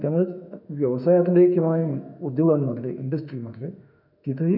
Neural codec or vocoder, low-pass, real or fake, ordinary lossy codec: autoencoder, 48 kHz, 32 numbers a frame, DAC-VAE, trained on Japanese speech; 5.4 kHz; fake; none